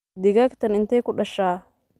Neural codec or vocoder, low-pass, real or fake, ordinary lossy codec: none; 14.4 kHz; real; Opus, 32 kbps